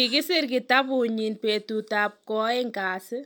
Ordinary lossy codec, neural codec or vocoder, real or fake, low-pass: none; none; real; none